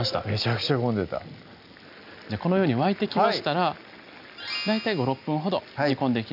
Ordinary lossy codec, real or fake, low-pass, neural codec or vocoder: none; real; 5.4 kHz; none